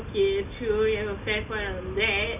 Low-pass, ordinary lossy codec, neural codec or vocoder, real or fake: 3.6 kHz; none; none; real